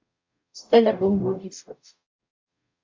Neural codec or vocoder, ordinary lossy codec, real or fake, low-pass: codec, 44.1 kHz, 0.9 kbps, DAC; MP3, 64 kbps; fake; 7.2 kHz